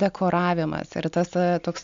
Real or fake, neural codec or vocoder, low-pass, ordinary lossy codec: real; none; 7.2 kHz; MP3, 64 kbps